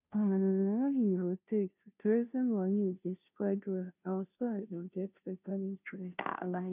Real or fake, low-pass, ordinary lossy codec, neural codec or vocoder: fake; 3.6 kHz; none; codec, 16 kHz, 0.5 kbps, FunCodec, trained on Chinese and English, 25 frames a second